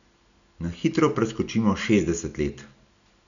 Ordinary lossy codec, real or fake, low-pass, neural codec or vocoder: none; real; 7.2 kHz; none